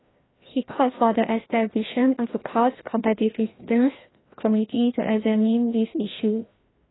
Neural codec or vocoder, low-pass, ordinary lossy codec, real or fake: codec, 16 kHz, 1 kbps, FreqCodec, larger model; 7.2 kHz; AAC, 16 kbps; fake